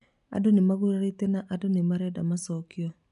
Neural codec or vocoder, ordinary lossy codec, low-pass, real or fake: none; none; 10.8 kHz; real